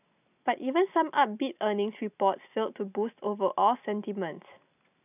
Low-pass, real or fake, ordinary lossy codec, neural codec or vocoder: 3.6 kHz; real; none; none